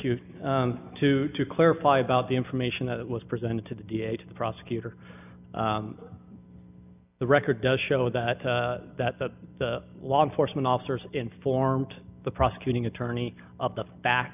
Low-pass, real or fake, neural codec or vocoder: 3.6 kHz; real; none